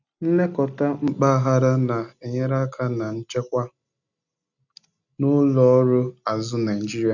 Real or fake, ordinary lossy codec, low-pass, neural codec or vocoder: real; none; 7.2 kHz; none